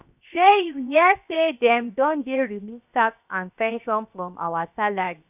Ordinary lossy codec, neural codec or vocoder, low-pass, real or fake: none; codec, 16 kHz, 0.7 kbps, FocalCodec; 3.6 kHz; fake